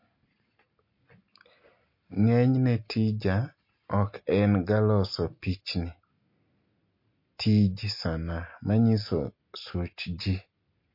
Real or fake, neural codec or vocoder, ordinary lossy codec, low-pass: real; none; MP3, 32 kbps; 5.4 kHz